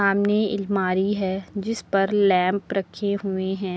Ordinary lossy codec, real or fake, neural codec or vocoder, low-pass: none; real; none; none